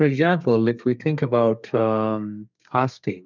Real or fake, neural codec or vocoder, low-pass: fake; codec, 32 kHz, 1.9 kbps, SNAC; 7.2 kHz